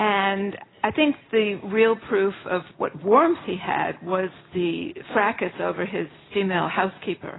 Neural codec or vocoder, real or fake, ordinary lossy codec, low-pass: none; real; AAC, 16 kbps; 7.2 kHz